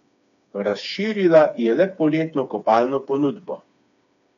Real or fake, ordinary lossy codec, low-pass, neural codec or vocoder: fake; none; 7.2 kHz; codec, 16 kHz, 4 kbps, FreqCodec, smaller model